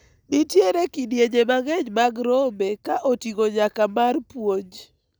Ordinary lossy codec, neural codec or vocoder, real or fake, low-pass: none; none; real; none